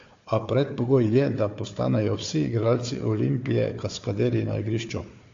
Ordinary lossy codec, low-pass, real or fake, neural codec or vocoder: AAC, 48 kbps; 7.2 kHz; fake; codec, 16 kHz, 4 kbps, FunCodec, trained on Chinese and English, 50 frames a second